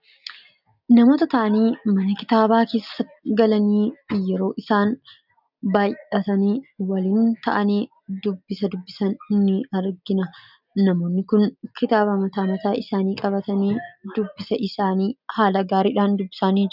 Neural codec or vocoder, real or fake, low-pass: none; real; 5.4 kHz